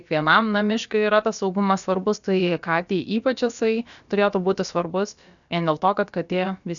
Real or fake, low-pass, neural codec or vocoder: fake; 7.2 kHz; codec, 16 kHz, about 1 kbps, DyCAST, with the encoder's durations